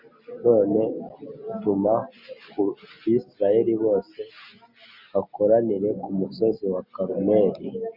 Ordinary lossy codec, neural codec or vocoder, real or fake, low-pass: MP3, 48 kbps; none; real; 5.4 kHz